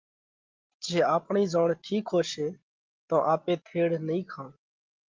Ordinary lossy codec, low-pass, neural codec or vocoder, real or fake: Opus, 32 kbps; 7.2 kHz; none; real